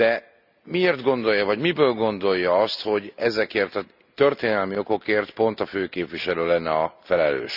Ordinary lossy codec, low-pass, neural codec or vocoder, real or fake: none; 5.4 kHz; none; real